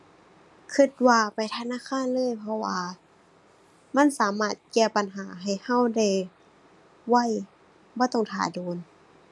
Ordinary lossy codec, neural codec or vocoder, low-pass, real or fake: none; none; none; real